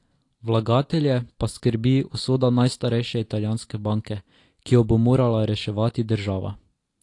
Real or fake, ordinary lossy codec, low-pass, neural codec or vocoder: real; AAC, 48 kbps; 10.8 kHz; none